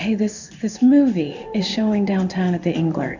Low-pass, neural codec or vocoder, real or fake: 7.2 kHz; codec, 16 kHz in and 24 kHz out, 1 kbps, XY-Tokenizer; fake